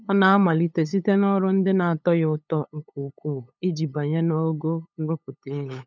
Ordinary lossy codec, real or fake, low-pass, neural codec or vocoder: none; fake; none; codec, 16 kHz, 8 kbps, FunCodec, trained on LibriTTS, 25 frames a second